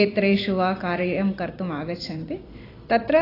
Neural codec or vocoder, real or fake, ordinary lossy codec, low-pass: vocoder, 44.1 kHz, 128 mel bands every 256 samples, BigVGAN v2; fake; AAC, 24 kbps; 5.4 kHz